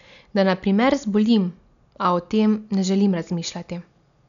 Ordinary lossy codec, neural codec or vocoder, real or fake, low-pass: none; none; real; 7.2 kHz